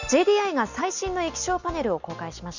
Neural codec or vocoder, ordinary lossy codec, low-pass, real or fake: none; none; 7.2 kHz; real